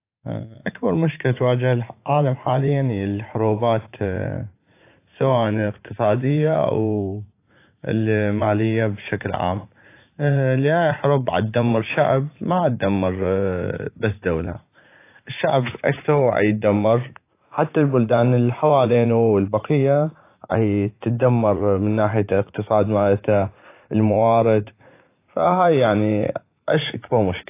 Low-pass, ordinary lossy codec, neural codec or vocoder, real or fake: 3.6 kHz; AAC, 24 kbps; vocoder, 44.1 kHz, 80 mel bands, Vocos; fake